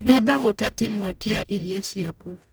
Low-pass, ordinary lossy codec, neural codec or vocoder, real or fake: none; none; codec, 44.1 kHz, 0.9 kbps, DAC; fake